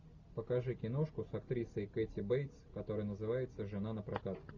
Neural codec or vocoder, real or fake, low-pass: none; real; 7.2 kHz